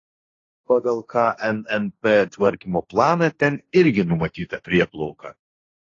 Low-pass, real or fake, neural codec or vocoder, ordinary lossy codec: 7.2 kHz; fake; codec, 16 kHz, 1.1 kbps, Voila-Tokenizer; AAC, 32 kbps